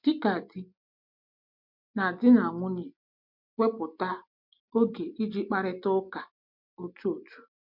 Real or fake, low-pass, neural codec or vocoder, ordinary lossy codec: real; 5.4 kHz; none; MP3, 48 kbps